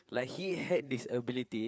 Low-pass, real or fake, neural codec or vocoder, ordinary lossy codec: none; fake; codec, 16 kHz, 4 kbps, FreqCodec, larger model; none